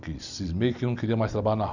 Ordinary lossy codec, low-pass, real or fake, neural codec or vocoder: none; 7.2 kHz; real; none